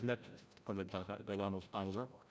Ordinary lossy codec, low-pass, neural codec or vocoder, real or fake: none; none; codec, 16 kHz, 0.5 kbps, FreqCodec, larger model; fake